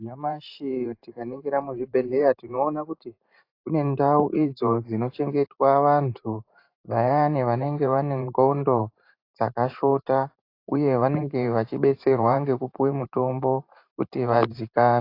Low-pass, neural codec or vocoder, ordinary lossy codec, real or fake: 5.4 kHz; vocoder, 44.1 kHz, 128 mel bands every 512 samples, BigVGAN v2; AAC, 32 kbps; fake